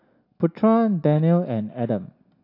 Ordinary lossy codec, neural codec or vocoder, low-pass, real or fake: AAC, 32 kbps; none; 5.4 kHz; real